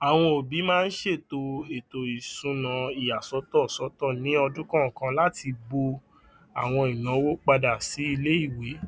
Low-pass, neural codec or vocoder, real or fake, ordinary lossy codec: none; none; real; none